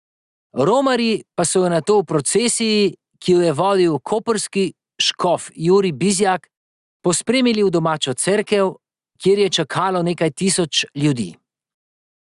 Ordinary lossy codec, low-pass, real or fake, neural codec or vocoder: Opus, 64 kbps; 10.8 kHz; real; none